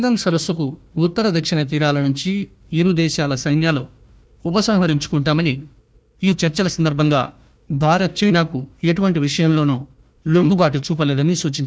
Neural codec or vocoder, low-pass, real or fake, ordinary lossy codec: codec, 16 kHz, 1 kbps, FunCodec, trained on Chinese and English, 50 frames a second; none; fake; none